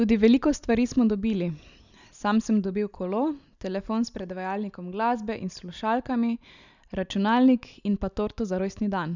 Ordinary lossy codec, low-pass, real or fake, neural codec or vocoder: none; 7.2 kHz; real; none